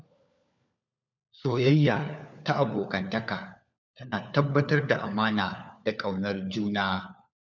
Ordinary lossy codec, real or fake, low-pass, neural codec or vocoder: none; fake; 7.2 kHz; codec, 16 kHz, 4 kbps, FunCodec, trained on LibriTTS, 50 frames a second